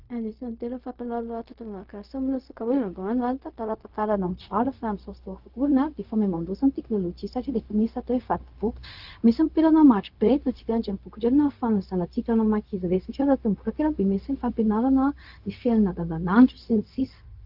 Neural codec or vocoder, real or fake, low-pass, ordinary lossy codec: codec, 16 kHz, 0.4 kbps, LongCat-Audio-Codec; fake; 5.4 kHz; Opus, 24 kbps